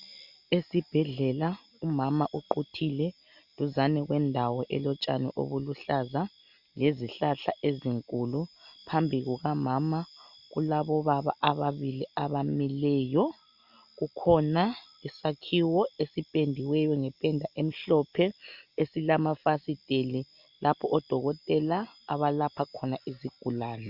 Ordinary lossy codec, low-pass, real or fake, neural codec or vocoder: Opus, 64 kbps; 5.4 kHz; real; none